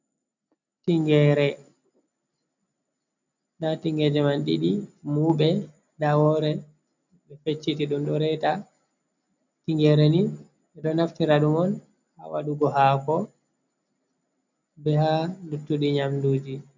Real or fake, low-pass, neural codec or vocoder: real; 7.2 kHz; none